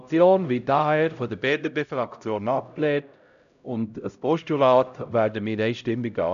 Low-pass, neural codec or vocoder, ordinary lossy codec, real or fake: 7.2 kHz; codec, 16 kHz, 0.5 kbps, X-Codec, HuBERT features, trained on LibriSpeech; none; fake